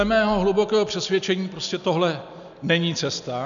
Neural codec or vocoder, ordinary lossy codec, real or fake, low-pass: none; MP3, 96 kbps; real; 7.2 kHz